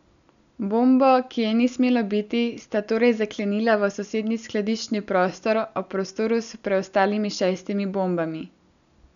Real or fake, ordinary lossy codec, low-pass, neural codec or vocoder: real; none; 7.2 kHz; none